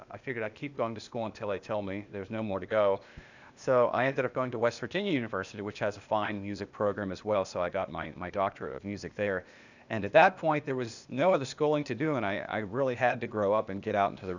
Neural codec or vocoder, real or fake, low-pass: codec, 16 kHz, 0.8 kbps, ZipCodec; fake; 7.2 kHz